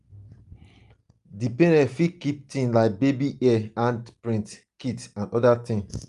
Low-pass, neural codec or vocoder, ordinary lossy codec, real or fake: 10.8 kHz; none; Opus, 32 kbps; real